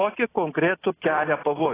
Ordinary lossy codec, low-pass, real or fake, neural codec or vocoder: AAC, 16 kbps; 3.6 kHz; real; none